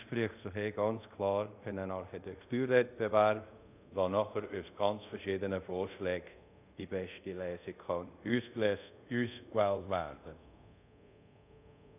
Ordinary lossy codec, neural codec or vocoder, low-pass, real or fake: none; codec, 24 kHz, 0.5 kbps, DualCodec; 3.6 kHz; fake